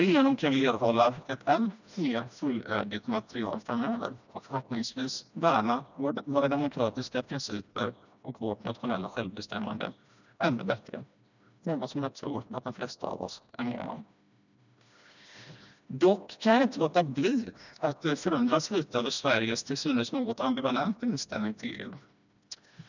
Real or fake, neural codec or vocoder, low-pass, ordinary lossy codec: fake; codec, 16 kHz, 1 kbps, FreqCodec, smaller model; 7.2 kHz; none